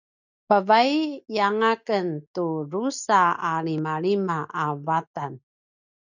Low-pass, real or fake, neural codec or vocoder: 7.2 kHz; real; none